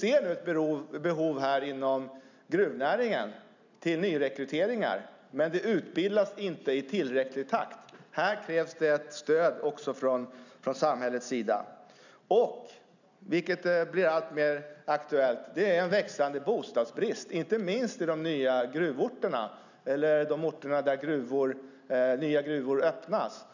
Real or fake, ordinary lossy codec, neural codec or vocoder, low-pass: real; none; none; 7.2 kHz